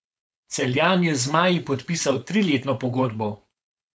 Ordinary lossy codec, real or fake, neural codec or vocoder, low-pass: none; fake; codec, 16 kHz, 4.8 kbps, FACodec; none